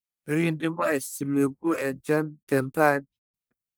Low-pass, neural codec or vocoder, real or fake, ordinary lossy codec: none; codec, 44.1 kHz, 1.7 kbps, Pupu-Codec; fake; none